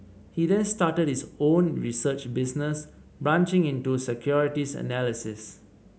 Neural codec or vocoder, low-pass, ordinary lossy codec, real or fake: none; none; none; real